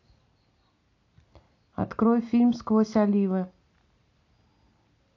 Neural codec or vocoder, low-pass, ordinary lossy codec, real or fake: none; 7.2 kHz; AAC, 48 kbps; real